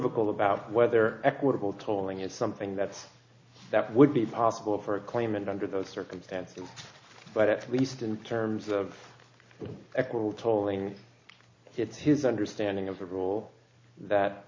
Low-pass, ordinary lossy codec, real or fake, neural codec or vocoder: 7.2 kHz; MP3, 48 kbps; real; none